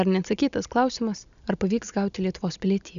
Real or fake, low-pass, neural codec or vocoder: real; 7.2 kHz; none